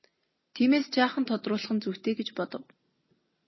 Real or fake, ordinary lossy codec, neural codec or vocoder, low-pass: real; MP3, 24 kbps; none; 7.2 kHz